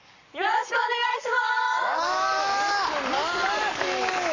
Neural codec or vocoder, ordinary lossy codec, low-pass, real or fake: codec, 44.1 kHz, 7.8 kbps, Pupu-Codec; none; 7.2 kHz; fake